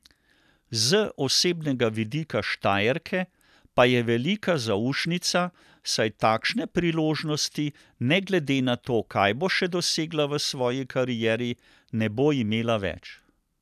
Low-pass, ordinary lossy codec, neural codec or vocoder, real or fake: 14.4 kHz; none; none; real